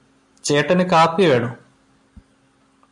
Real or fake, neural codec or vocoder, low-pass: real; none; 10.8 kHz